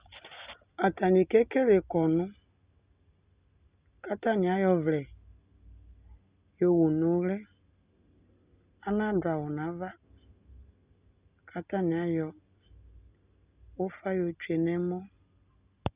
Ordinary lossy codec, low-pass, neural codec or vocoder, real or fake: Opus, 64 kbps; 3.6 kHz; none; real